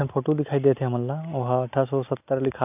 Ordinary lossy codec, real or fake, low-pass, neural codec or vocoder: none; real; 3.6 kHz; none